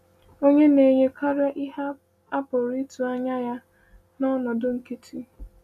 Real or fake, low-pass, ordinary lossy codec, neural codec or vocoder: real; 14.4 kHz; none; none